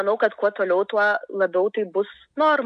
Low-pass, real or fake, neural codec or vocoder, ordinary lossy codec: 9.9 kHz; real; none; AAC, 96 kbps